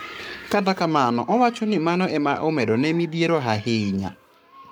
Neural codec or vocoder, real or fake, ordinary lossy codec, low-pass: codec, 44.1 kHz, 7.8 kbps, Pupu-Codec; fake; none; none